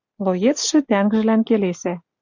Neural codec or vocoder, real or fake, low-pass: none; real; 7.2 kHz